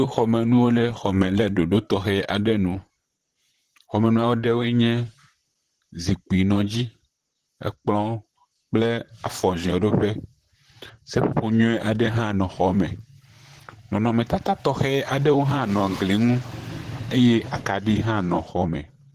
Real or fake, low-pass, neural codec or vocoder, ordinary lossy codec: fake; 14.4 kHz; vocoder, 44.1 kHz, 128 mel bands, Pupu-Vocoder; Opus, 16 kbps